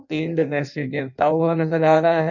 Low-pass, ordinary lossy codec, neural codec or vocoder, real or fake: 7.2 kHz; none; codec, 16 kHz in and 24 kHz out, 0.6 kbps, FireRedTTS-2 codec; fake